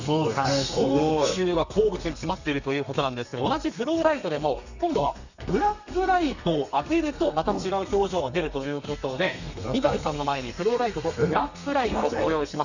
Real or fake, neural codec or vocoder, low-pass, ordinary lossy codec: fake; codec, 32 kHz, 1.9 kbps, SNAC; 7.2 kHz; none